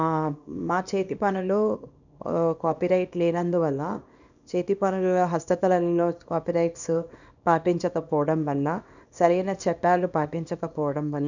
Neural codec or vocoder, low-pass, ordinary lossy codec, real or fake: codec, 24 kHz, 0.9 kbps, WavTokenizer, small release; 7.2 kHz; none; fake